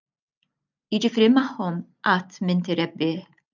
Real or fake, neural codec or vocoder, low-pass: real; none; 7.2 kHz